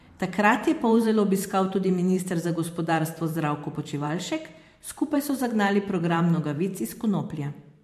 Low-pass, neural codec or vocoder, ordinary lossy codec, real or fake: 14.4 kHz; vocoder, 44.1 kHz, 128 mel bands every 512 samples, BigVGAN v2; MP3, 64 kbps; fake